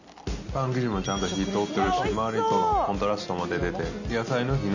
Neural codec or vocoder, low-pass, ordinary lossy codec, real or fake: none; 7.2 kHz; none; real